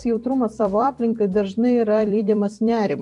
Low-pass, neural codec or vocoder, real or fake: 10.8 kHz; vocoder, 44.1 kHz, 128 mel bands every 256 samples, BigVGAN v2; fake